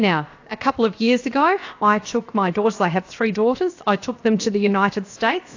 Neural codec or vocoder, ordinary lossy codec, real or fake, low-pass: codec, 16 kHz, about 1 kbps, DyCAST, with the encoder's durations; AAC, 48 kbps; fake; 7.2 kHz